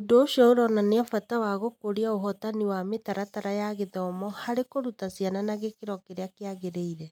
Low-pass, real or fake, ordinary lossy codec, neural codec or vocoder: 19.8 kHz; real; none; none